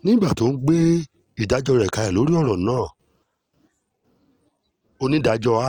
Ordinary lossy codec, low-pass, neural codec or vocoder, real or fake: Opus, 24 kbps; 19.8 kHz; none; real